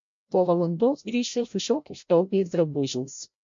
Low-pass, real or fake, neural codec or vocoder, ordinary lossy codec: 7.2 kHz; fake; codec, 16 kHz, 0.5 kbps, FreqCodec, larger model; MP3, 48 kbps